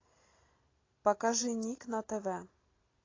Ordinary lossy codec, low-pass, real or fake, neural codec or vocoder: AAC, 32 kbps; 7.2 kHz; real; none